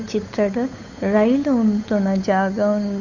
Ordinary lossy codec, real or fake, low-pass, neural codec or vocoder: none; fake; 7.2 kHz; codec, 16 kHz, 8 kbps, FunCodec, trained on Chinese and English, 25 frames a second